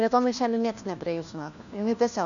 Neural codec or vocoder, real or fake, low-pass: codec, 16 kHz, 1 kbps, FunCodec, trained on LibriTTS, 50 frames a second; fake; 7.2 kHz